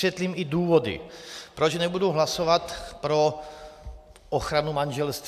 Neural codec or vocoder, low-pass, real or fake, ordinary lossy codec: none; 14.4 kHz; real; AAC, 96 kbps